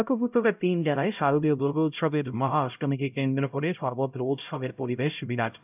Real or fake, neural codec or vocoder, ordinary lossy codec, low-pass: fake; codec, 16 kHz, 0.5 kbps, X-Codec, HuBERT features, trained on LibriSpeech; none; 3.6 kHz